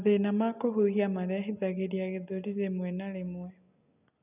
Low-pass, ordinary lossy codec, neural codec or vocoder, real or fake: 3.6 kHz; none; none; real